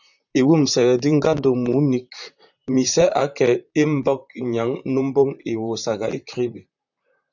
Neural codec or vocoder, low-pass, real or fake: vocoder, 44.1 kHz, 128 mel bands, Pupu-Vocoder; 7.2 kHz; fake